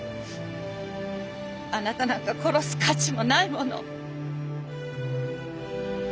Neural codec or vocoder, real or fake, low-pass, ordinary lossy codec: none; real; none; none